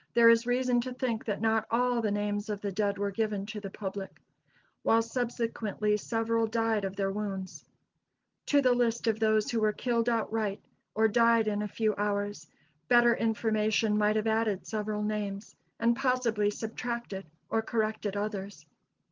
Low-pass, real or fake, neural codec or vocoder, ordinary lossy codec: 7.2 kHz; real; none; Opus, 32 kbps